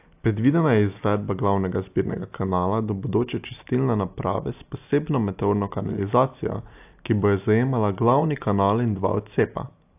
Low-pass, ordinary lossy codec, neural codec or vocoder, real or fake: 3.6 kHz; AAC, 32 kbps; none; real